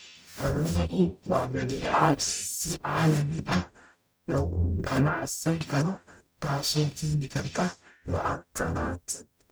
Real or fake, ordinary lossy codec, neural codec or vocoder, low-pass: fake; none; codec, 44.1 kHz, 0.9 kbps, DAC; none